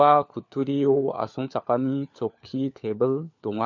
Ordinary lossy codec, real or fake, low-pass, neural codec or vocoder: none; fake; 7.2 kHz; codec, 16 kHz, 4 kbps, FunCodec, trained on LibriTTS, 50 frames a second